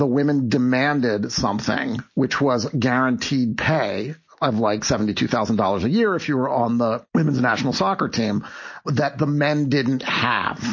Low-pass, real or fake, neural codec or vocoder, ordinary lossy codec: 7.2 kHz; real; none; MP3, 32 kbps